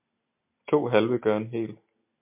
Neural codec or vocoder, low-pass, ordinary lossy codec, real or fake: none; 3.6 kHz; MP3, 32 kbps; real